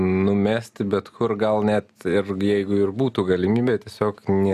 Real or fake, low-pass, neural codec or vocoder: real; 14.4 kHz; none